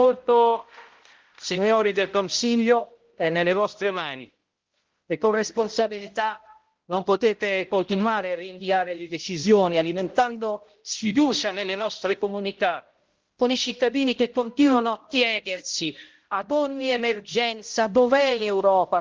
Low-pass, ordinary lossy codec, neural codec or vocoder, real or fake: 7.2 kHz; Opus, 16 kbps; codec, 16 kHz, 0.5 kbps, X-Codec, HuBERT features, trained on balanced general audio; fake